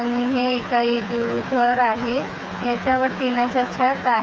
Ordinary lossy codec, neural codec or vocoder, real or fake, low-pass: none; codec, 16 kHz, 4 kbps, FreqCodec, smaller model; fake; none